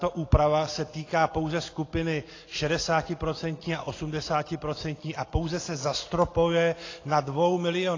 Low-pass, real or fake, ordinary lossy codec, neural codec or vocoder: 7.2 kHz; real; AAC, 32 kbps; none